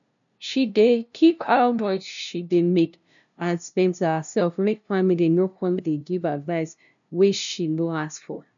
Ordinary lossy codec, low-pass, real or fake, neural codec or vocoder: none; 7.2 kHz; fake; codec, 16 kHz, 0.5 kbps, FunCodec, trained on LibriTTS, 25 frames a second